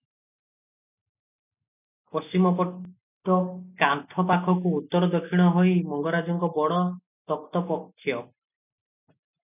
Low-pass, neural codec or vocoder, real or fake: 3.6 kHz; none; real